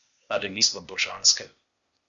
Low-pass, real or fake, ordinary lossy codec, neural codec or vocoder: 7.2 kHz; fake; Opus, 64 kbps; codec, 16 kHz, 0.8 kbps, ZipCodec